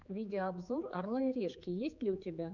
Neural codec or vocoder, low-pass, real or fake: codec, 16 kHz, 4 kbps, X-Codec, HuBERT features, trained on general audio; 7.2 kHz; fake